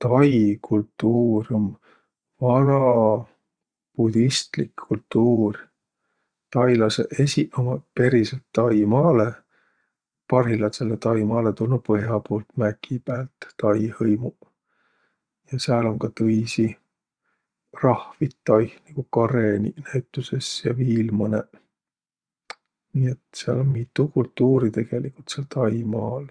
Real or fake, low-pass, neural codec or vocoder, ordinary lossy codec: fake; 9.9 kHz; vocoder, 22.05 kHz, 80 mel bands, WaveNeXt; Opus, 64 kbps